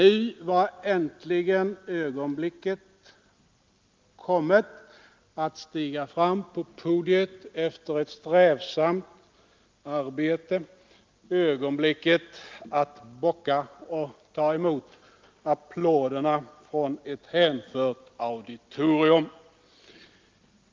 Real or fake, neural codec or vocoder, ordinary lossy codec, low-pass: real; none; Opus, 32 kbps; 7.2 kHz